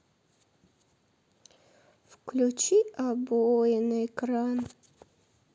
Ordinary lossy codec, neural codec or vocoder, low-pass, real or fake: none; none; none; real